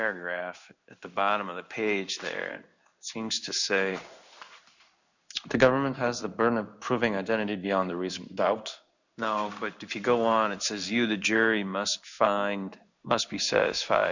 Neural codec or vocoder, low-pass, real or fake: codec, 16 kHz in and 24 kHz out, 1 kbps, XY-Tokenizer; 7.2 kHz; fake